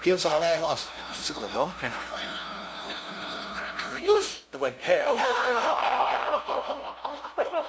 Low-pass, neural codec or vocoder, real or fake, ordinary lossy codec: none; codec, 16 kHz, 0.5 kbps, FunCodec, trained on LibriTTS, 25 frames a second; fake; none